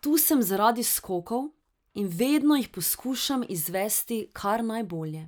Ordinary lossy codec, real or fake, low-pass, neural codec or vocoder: none; real; none; none